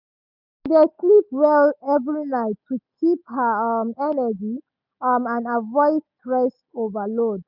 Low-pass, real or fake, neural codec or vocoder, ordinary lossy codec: 5.4 kHz; real; none; none